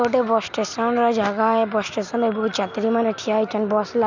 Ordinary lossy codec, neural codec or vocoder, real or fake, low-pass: none; none; real; 7.2 kHz